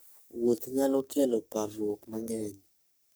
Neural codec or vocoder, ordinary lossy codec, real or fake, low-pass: codec, 44.1 kHz, 3.4 kbps, Pupu-Codec; none; fake; none